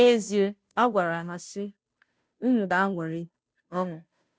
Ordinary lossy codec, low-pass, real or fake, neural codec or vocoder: none; none; fake; codec, 16 kHz, 0.5 kbps, FunCodec, trained on Chinese and English, 25 frames a second